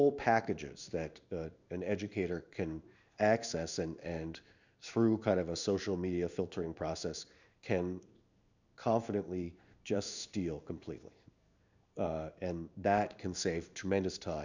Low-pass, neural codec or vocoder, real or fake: 7.2 kHz; codec, 16 kHz in and 24 kHz out, 1 kbps, XY-Tokenizer; fake